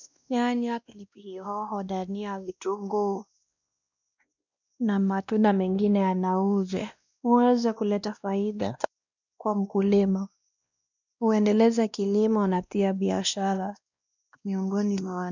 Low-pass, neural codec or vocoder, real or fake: 7.2 kHz; codec, 16 kHz, 1 kbps, X-Codec, WavLM features, trained on Multilingual LibriSpeech; fake